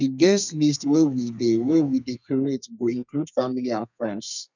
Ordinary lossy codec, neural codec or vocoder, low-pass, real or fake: none; codec, 32 kHz, 1.9 kbps, SNAC; 7.2 kHz; fake